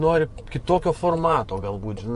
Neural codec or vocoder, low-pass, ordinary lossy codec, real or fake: vocoder, 24 kHz, 100 mel bands, Vocos; 10.8 kHz; MP3, 64 kbps; fake